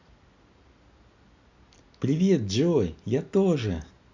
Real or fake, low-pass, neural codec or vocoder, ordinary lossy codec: real; 7.2 kHz; none; Opus, 64 kbps